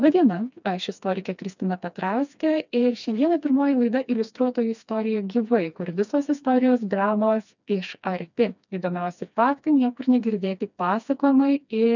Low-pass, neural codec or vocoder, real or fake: 7.2 kHz; codec, 16 kHz, 2 kbps, FreqCodec, smaller model; fake